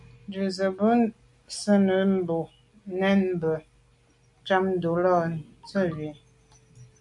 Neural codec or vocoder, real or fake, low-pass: none; real; 10.8 kHz